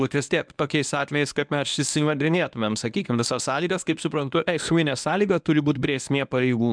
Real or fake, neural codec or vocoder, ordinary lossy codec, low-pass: fake; codec, 24 kHz, 0.9 kbps, WavTokenizer, medium speech release version 1; MP3, 96 kbps; 9.9 kHz